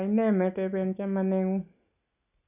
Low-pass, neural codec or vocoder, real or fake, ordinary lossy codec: 3.6 kHz; none; real; none